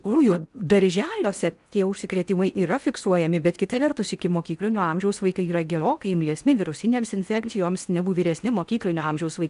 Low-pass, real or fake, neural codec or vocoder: 10.8 kHz; fake; codec, 16 kHz in and 24 kHz out, 0.8 kbps, FocalCodec, streaming, 65536 codes